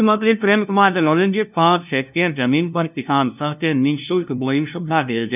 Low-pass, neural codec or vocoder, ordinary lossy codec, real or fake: 3.6 kHz; codec, 16 kHz, 0.5 kbps, FunCodec, trained on LibriTTS, 25 frames a second; none; fake